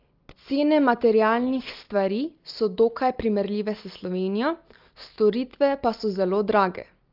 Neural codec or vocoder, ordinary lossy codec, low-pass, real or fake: none; Opus, 24 kbps; 5.4 kHz; real